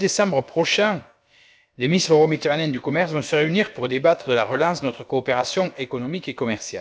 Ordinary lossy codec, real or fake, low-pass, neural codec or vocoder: none; fake; none; codec, 16 kHz, about 1 kbps, DyCAST, with the encoder's durations